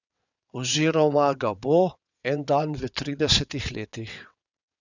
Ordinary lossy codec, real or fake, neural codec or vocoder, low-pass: none; fake; vocoder, 22.05 kHz, 80 mel bands, WaveNeXt; 7.2 kHz